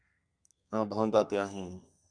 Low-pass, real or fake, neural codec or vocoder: 9.9 kHz; fake; codec, 32 kHz, 1.9 kbps, SNAC